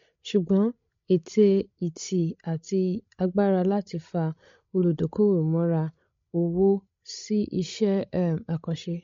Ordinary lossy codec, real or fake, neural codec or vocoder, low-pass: MP3, 48 kbps; fake; codec, 16 kHz, 16 kbps, FreqCodec, larger model; 7.2 kHz